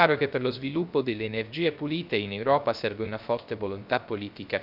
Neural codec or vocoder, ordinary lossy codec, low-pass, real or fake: codec, 16 kHz, 0.3 kbps, FocalCodec; none; 5.4 kHz; fake